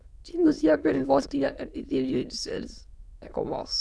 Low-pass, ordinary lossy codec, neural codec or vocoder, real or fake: none; none; autoencoder, 22.05 kHz, a latent of 192 numbers a frame, VITS, trained on many speakers; fake